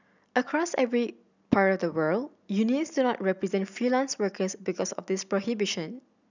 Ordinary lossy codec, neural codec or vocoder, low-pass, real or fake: none; none; 7.2 kHz; real